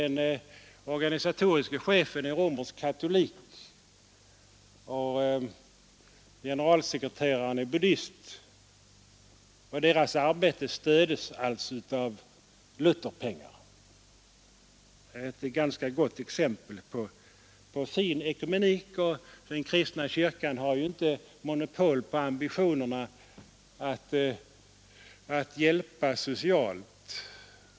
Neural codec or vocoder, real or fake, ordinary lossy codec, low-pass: none; real; none; none